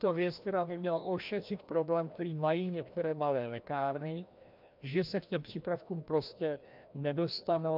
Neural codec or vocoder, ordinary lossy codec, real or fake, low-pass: codec, 16 kHz, 1 kbps, FreqCodec, larger model; MP3, 48 kbps; fake; 5.4 kHz